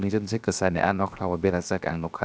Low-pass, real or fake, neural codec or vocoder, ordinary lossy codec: none; fake; codec, 16 kHz, 0.7 kbps, FocalCodec; none